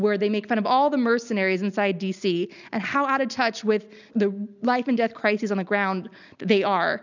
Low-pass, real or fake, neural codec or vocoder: 7.2 kHz; real; none